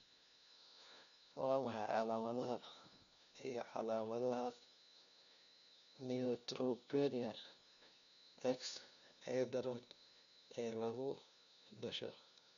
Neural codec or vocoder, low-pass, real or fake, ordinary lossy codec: codec, 16 kHz, 1 kbps, FunCodec, trained on LibriTTS, 50 frames a second; 7.2 kHz; fake; none